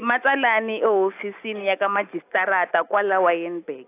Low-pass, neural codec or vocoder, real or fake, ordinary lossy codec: 3.6 kHz; none; real; AAC, 24 kbps